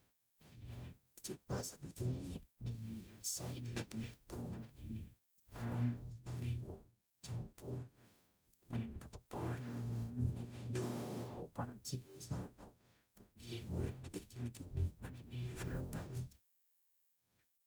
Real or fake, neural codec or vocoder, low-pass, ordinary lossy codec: fake; codec, 44.1 kHz, 0.9 kbps, DAC; none; none